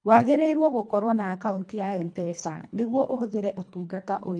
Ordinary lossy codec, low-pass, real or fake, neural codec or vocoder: none; 9.9 kHz; fake; codec, 24 kHz, 1.5 kbps, HILCodec